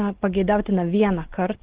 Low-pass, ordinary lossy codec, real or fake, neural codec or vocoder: 3.6 kHz; Opus, 16 kbps; real; none